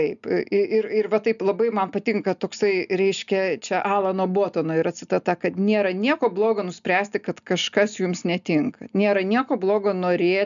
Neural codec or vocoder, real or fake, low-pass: none; real; 7.2 kHz